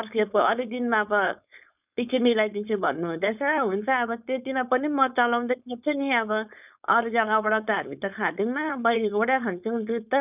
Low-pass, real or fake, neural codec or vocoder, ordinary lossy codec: 3.6 kHz; fake; codec, 16 kHz, 4.8 kbps, FACodec; none